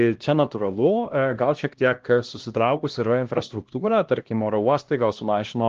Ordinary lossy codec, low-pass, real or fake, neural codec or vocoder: Opus, 16 kbps; 7.2 kHz; fake; codec, 16 kHz, 1 kbps, X-Codec, HuBERT features, trained on LibriSpeech